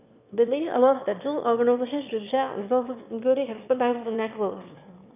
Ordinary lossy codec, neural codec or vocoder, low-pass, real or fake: none; autoencoder, 22.05 kHz, a latent of 192 numbers a frame, VITS, trained on one speaker; 3.6 kHz; fake